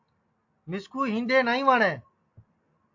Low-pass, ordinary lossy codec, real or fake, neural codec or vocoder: 7.2 kHz; AAC, 48 kbps; real; none